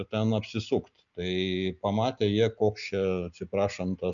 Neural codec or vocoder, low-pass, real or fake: none; 7.2 kHz; real